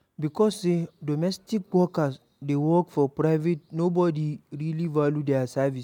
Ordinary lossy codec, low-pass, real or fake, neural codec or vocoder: none; 19.8 kHz; real; none